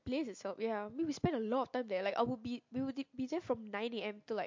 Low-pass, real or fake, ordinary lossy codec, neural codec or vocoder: 7.2 kHz; real; MP3, 48 kbps; none